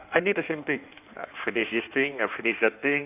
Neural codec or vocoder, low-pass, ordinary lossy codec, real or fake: codec, 16 kHz in and 24 kHz out, 1.1 kbps, FireRedTTS-2 codec; 3.6 kHz; none; fake